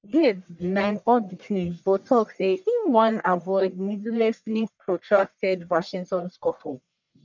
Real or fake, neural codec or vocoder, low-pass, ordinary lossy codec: fake; codec, 44.1 kHz, 1.7 kbps, Pupu-Codec; 7.2 kHz; none